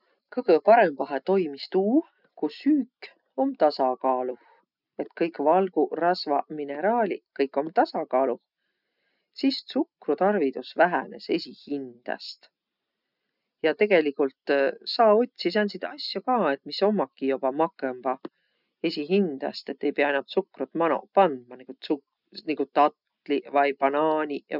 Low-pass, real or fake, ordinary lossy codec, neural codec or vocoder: 5.4 kHz; real; none; none